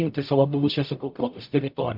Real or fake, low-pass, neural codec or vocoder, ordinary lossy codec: fake; 5.4 kHz; codec, 44.1 kHz, 0.9 kbps, DAC; MP3, 48 kbps